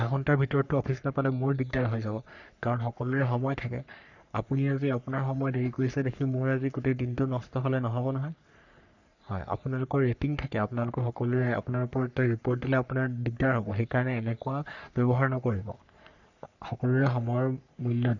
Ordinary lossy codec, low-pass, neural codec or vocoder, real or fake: none; 7.2 kHz; codec, 44.1 kHz, 3.4 kbps, Pupu-Codec; fake